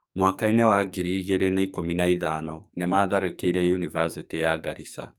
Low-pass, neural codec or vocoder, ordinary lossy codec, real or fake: none; codec, 44.1 kHz, 2.6 kbps, SNAC; none; fake